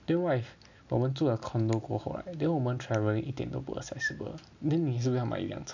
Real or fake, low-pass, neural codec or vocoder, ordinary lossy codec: real; 7.2 kHz; none; none